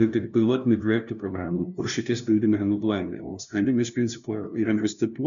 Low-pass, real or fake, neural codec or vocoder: 7.2 kHz; fake; codec, 16 kHz, 0.5 kbps, FunCodec, trained on LibriTTS, 25 frames a second